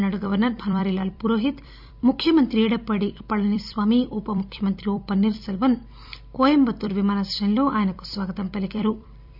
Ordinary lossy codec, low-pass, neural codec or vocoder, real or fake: none; 5.4 kHz; vocoder, 44.1 kHz, 128 mel bands every 256 samples, BigVGAN v2; fake